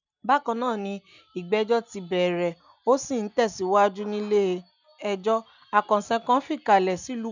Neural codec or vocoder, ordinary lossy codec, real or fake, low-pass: none; none; real; 7.2 kHz